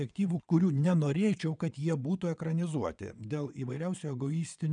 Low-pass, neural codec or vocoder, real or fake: 9.9 kHz; vocoder, 22.05 kHz, 80 mel bands, WaveNeXt; fake